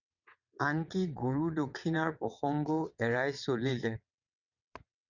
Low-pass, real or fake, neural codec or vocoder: 7.2 kHz; fake; vocoder, 22.05 kHz, 80 mel bands, WaveNeXt